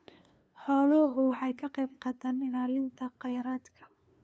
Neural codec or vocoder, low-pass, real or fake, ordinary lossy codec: codec, 16 kHz, 2 kbps, FunCodec, trained on LibriTTS, 25 frames a second; none; fake; none